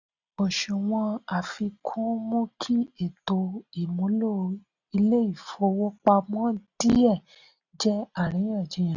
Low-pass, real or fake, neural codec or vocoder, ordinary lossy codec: 7.2 kHz; real; none; none